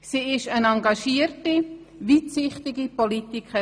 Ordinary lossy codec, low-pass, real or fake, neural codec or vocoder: none; none; real; none